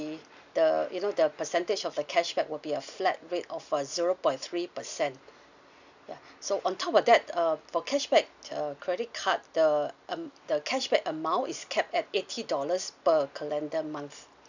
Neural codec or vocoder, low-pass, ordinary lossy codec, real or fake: none; 7.2 kHz; none; real